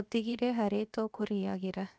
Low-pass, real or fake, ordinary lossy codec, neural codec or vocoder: none; fake; none; codec, 16 kHz, 0.7 kbps, FocalCodec